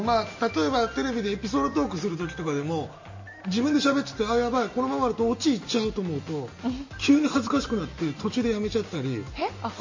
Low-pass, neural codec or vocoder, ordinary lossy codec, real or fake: 7.2 kHz; none; MP3, 32 kbps; real